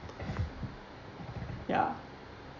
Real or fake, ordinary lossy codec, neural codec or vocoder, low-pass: real; none; none; 7.2 kHz